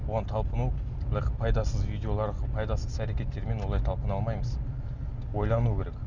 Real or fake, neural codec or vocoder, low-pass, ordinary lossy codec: real; none; 7.2 kHz; none